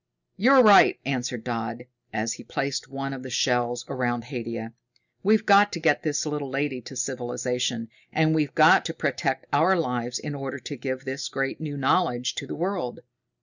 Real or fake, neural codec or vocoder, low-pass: real; none; 7.2 kHz